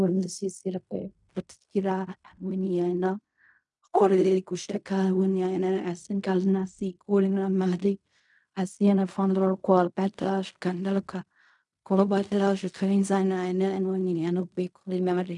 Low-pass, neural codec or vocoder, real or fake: 10.8 kHz; codec, 16 kHz in and 24 kHz out, 0.4 kbps, LongCat-Audio-Codec, fine tuned four codebook decoder; fake